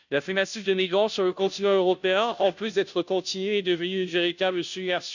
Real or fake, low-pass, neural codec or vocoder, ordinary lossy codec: fake; 7.2 kHz; codec, 16 kHz, 0.5 kbps, FunCodec, trained on Chinese and English, 25 frames a second; none